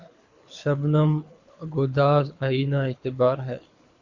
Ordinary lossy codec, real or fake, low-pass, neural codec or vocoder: Opus, 64 kbps; fake; 7.2 kHz; codec, 24 kHz, 6 kbps, HILCodec